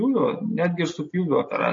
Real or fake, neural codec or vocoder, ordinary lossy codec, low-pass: real; none; MP3, 32 kbps; 7.2 kHz